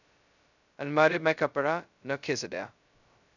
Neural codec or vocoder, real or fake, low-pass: codec, 16 kHz, 0.2 kbps, FocalCodec; fake; 7.2 kHz